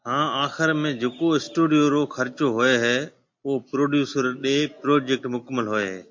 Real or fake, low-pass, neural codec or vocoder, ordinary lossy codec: real; 7.2 kHz; none; MP3, 48 kbps